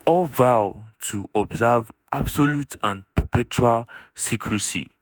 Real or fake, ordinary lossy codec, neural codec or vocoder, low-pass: fake; none; autoencoder, 48 kHz, 32 numbers a frame, DAC-VAE, trained on Japanese speech; none